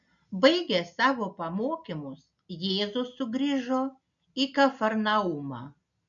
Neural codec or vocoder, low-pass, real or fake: none; 7.2 kHz; real